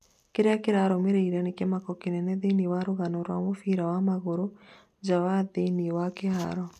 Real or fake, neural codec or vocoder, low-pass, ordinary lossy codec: real; none; 14.4 kHz; none